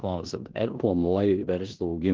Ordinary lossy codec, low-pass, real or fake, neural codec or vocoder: Opus, 16 kbps; 7.2 kHz; fake; codec, 16 kHz in and 24 kHz out, 0.9 kbps, LongCat-Audio-Codec, four codebook decoder